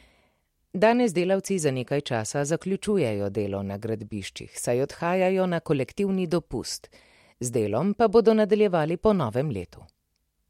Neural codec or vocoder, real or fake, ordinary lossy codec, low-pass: none; real; MP3, 64 kbps; 19.8 kHz